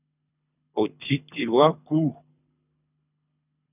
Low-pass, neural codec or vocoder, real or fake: 3.6 kHz; codec, 24 kHz, 3 kbps, HILCodec; fake